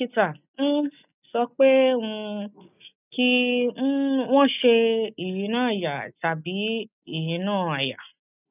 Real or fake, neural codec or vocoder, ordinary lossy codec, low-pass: real; none; none; 3.6 kHz